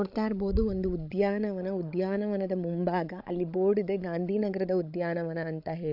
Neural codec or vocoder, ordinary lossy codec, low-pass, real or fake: codec, 16 kHz, 16 kbps, FreqCodec, larger model; AAC, 48 kbps; 5.4 kHz; fake